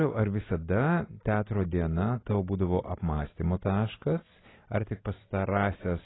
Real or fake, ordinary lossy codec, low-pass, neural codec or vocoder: real; AAC, 16 kbps; 7.2 kHz; none